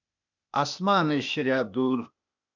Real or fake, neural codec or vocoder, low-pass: fake; codec, 16 kHz, 0.8 kbps, ZipCodec; 7.2 kHz